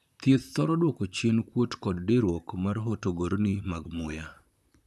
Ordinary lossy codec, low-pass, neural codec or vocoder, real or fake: none; 14.4 kHz; vocoder, 44.1 kHz, 128 mel bands, Pupu-Vocoder; fake